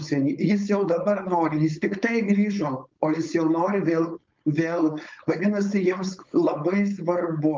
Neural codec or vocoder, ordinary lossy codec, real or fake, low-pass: codec, 16 kHz, 4.8 kbps, FACodec; Opus, 24 kbps; fake; 7.2 kHz